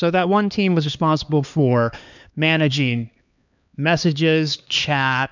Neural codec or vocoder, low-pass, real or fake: codec, 16 kHz, 2 kbps, X-Codec, HuBERT features, trained on LibriSpeech; 7.2 kHz; fake